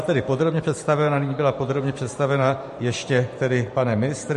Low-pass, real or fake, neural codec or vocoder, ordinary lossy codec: 14.4 kHz; fake; vocoder, 44.1 kHz, 128 mel bands every 512 samples, BigVGAN v2; MP3, 48 kbps